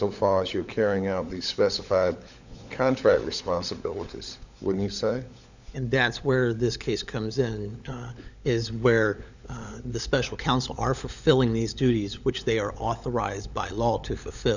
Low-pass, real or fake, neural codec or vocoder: 7.2 kHz; fake; codec, 16 kHz, 16 kbps, FunCodec, trained on LibriTTS, 50 frames a second